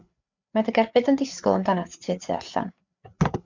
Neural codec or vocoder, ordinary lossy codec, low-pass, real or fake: codec, 16 kHz, 4 kbps, FreqCodec, larger model; MP3, 64 kbps; 7.2 kHz; fake